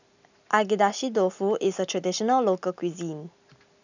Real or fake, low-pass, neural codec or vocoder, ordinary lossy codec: real; 7.2 kHz; none; none